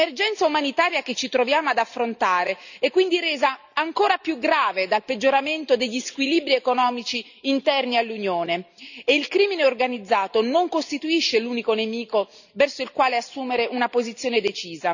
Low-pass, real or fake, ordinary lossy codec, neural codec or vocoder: 7.2 kHz; real; none; none